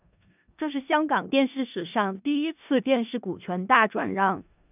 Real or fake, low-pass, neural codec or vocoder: fake; 3.6 kHz; codec, 16 kHz in and 24 kHz out, 0.4 kbps, LongCat-Audio-Codec, four codebook decoder